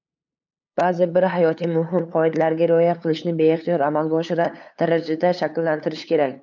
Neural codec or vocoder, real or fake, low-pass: codec, 16 kHz, 8 kbps, FunCodec, trained on LibriTTS, 25 frames a second; fake; 7.2 kHz